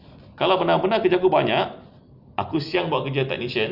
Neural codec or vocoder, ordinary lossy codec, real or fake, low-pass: none; none; real; 5.4 kHz